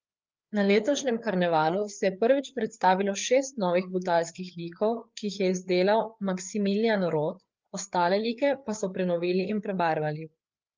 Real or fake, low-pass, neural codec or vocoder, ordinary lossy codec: fake; 7.2 kHz; codec, 16 kHz, 4 kbps, FreqCodec, larger model; Opus, 32 kbps